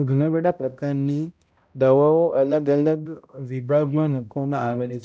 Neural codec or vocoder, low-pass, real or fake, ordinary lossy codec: codec, 16 kHz, 0.5 kbps, X-Codec, HuBERT features, trained on balanced general audio; none; fake; none